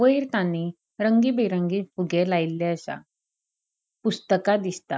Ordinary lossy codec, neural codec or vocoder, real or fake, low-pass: none; none; real; none